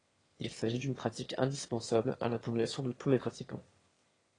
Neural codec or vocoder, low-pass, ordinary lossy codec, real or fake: autoencoder, 22.05 kHz, a latent of 192 numbers a frame, VITS, trained on one speaker; 9.9 kHz; AAC, 32 kbps; fake